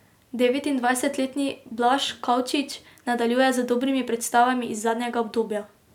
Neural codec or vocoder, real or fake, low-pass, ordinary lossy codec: none; real; 19.8 kHz; none